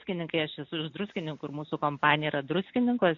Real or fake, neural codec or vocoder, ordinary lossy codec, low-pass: real; none; AAC, 48 kbps; 7.2 kHz